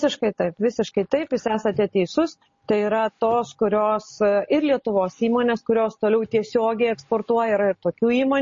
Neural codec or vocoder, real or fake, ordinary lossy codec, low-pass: none; real; MP3, 32 kbps; 7.2 kHz